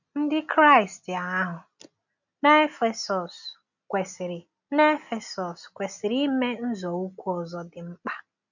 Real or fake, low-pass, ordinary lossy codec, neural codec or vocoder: real; 7.2 kHz; none; none